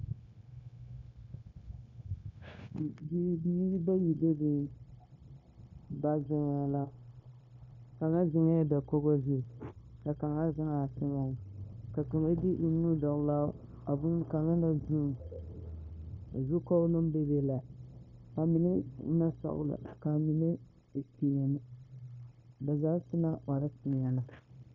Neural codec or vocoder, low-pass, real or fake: codec, 16 kHz, 0.9 kbps, LongCat-Audio-Codec; 7.2 kHz; fake